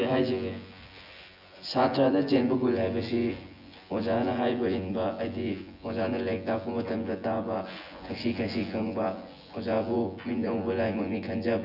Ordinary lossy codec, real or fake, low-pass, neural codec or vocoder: none; fake; 5.4 kHz; vocoder, 24 kHz, 100 mel bands, Vocos